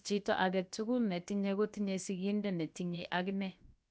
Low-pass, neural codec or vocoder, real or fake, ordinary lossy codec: none; codec, 16 kHz, about 1 kbps, DyCAST, with the encoder's durations; fake; none